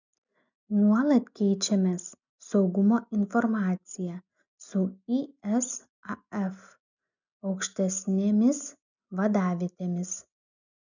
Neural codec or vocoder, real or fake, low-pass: none; real; 7.2 kHz